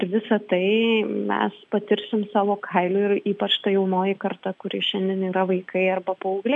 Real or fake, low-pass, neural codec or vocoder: real; 10.8 kHz; none